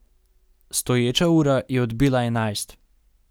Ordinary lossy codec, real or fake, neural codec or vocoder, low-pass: none; real; none; none